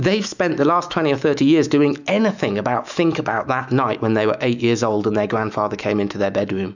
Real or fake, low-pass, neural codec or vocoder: fake; 7.2 kHz; autoencoder, 48 kHz, 128 numbers a frame, DAC-VAE, trained on Japanese speech